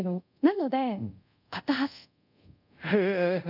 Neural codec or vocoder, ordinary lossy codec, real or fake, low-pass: codec, 24 kHz, 0.5 kbps, DualCodec; none; fake; 5.4 kHz